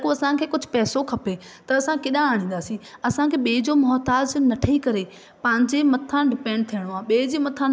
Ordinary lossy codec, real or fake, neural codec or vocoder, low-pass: none; real; none; none